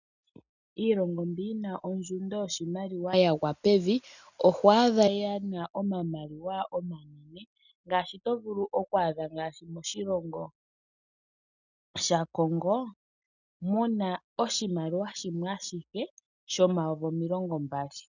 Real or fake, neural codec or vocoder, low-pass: real; none; 7.2 kHz